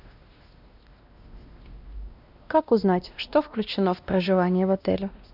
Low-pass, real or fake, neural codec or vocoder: 5.4 kHz; fake; codec, 16 kHz, 1 kbps, X-Codec, WavLM features, trained on Multilingual LibriSpeech